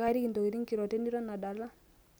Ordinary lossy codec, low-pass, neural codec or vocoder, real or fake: none; none; none; real